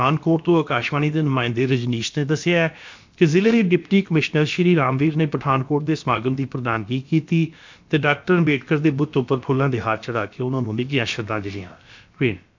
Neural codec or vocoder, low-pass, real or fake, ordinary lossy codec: codec, 16 kHz, about 1 kbps, DyCAST, with the encoder's durations; 7.2 kHz; fake; MP3, 64 kbps